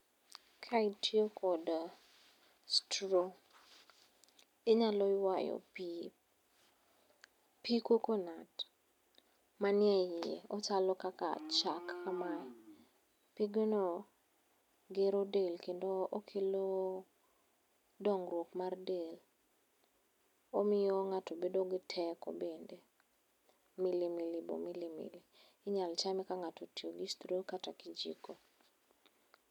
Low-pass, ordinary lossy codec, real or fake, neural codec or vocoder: 19.8 kHz; none; real; none